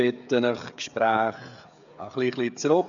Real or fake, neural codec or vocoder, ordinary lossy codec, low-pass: fake; codec, 16 kHz, 16 kbps, FreqCodec, smaller model; none; 7.2 kHz